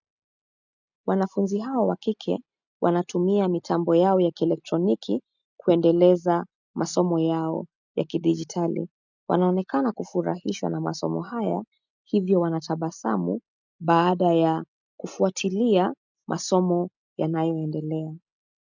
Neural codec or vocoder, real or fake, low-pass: none; real; 7.2 kHz